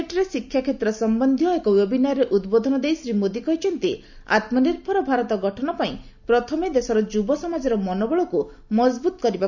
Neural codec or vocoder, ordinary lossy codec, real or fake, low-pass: none; none; real; 7.2 kHz